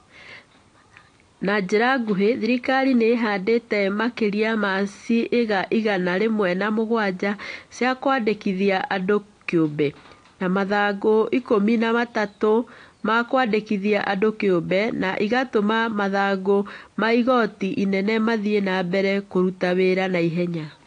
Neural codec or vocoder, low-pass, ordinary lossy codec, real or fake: none; 9.9 kHz; AAC, 48 kbps; real